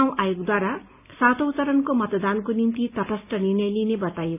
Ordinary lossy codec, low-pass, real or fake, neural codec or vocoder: none; 3.6 kHz; real; none